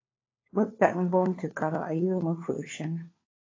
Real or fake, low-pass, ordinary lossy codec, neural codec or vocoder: fake; 7.2 kHz; AAC, 32 kbps; codec, 16 kHz, 4 kbps, FunCodec, trained on LibriTTS, 50 frames a second